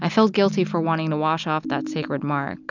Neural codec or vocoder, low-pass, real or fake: none; 7.2 kHz; real